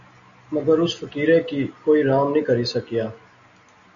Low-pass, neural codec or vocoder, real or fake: 7.2 kHz; none; real